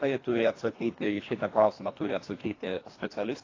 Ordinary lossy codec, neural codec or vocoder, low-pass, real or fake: AAC, 32 kbps; codec, 24 kHz, 1.5 kbps, HILCodec; 7.2 kHz; fake